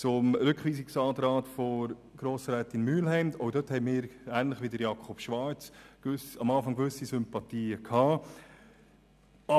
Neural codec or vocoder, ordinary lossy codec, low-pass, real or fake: none; none; 14.4 kHz; real